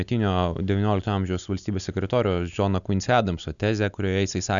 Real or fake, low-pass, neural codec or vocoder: real; 7.2 kHz; none